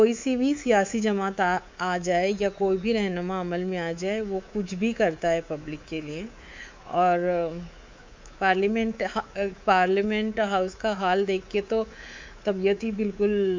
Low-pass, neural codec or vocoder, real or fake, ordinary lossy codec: 7.2 kHz; codec, 24 kHz, 3.1 kbps, DualCodec; fake; MP3, 64 kbps